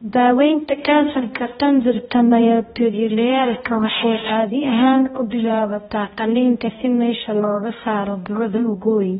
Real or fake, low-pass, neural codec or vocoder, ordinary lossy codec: fake; 7.2 kHz; codec, 16 kHz, 0.5 kbps, X-Codec, HuBERT features, trained on balanced general audio; AAC, 16 kbps